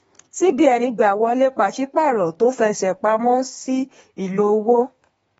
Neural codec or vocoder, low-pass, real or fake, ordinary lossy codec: codec, 32 kHz, 1.9 kbps, SNAC; 14.4 kHz; fake; AAC, 24 kbps